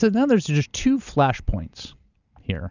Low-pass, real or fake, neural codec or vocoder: 7.2 kHz; real; none